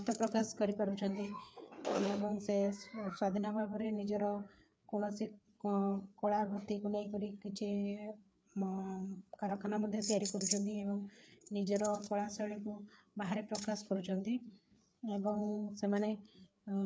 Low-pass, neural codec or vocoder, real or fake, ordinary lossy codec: none; codec, 16 kHz, 4 kbps, FreqCodec, larger model; fake; none